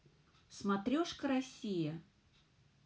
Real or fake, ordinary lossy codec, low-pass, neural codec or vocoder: real; none; none; none